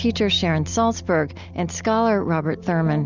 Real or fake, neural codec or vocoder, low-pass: real; none; 7.2 kHz